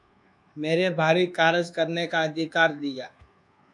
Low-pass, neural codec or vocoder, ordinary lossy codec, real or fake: 10.8 kHz; codec, 24 kHz, 1.2 kbps, DualCodec; MP3, 96 kbps; fake